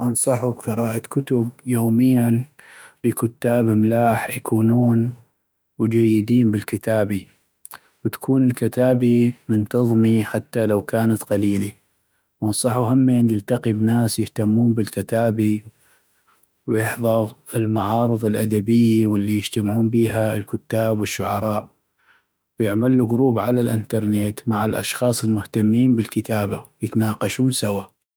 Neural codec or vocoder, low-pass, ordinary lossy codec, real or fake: autoencoder, 48 kHz, 32 numbers a frame, DAC-VAE, trained on Japanese speech; none; none; fake